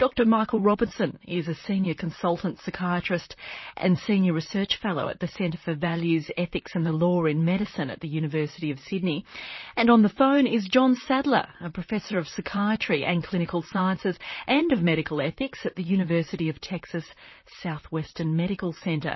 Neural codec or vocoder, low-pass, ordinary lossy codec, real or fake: vocoder, 44.1 kHz, 128 mel bands, Pupu-Vocoder; 7.2 kHz; MP3, 24 kbps; fake